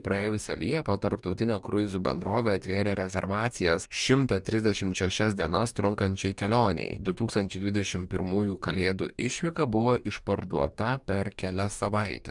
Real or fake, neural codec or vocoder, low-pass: fake; codec, 44.1 kHz, 2.6 kbps, DAC; 10.8 kHz